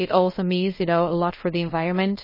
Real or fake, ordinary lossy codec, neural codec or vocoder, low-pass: fake; MP3, 24 kbps; codec, 24 kHz, 0.5 kbps, DualCodec; 5.4 kHz